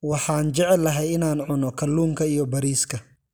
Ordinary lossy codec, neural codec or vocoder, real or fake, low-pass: none; none; real; none